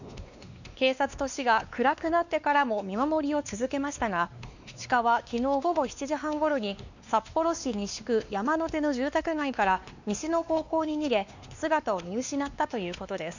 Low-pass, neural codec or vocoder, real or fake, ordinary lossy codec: 7.2 kHz; codec, 16 kHz, 2 kbps, X-Codec, WavLM features, trained on Multilingual LibriSpeech; fake; none